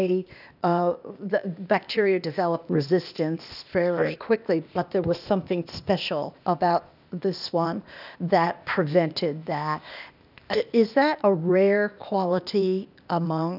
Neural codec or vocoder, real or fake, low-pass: codec, 16 kHz, 0.8 kbps, ZipCodec; fake; 5.4 kHz